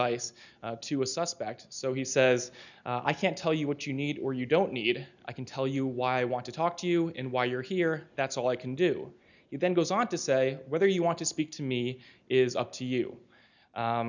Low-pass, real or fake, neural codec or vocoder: 7.2 kHz; real; none